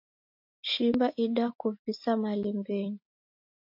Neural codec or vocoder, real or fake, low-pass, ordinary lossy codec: none; real; 5.4 kHz; AAC, 48 kbps